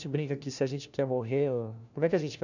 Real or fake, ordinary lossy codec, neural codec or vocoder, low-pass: fake; none; codec, 16 kHz, 1 kbps, FunCodec, trained on LibriTTS, 50 frames a second; 7.2 kHz